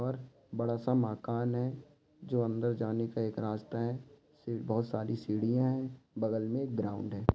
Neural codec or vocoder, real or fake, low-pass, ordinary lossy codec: none; real; none; none